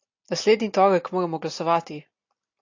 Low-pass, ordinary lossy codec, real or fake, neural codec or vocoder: 7.2 kHz; AAC, 48 kbps; real; none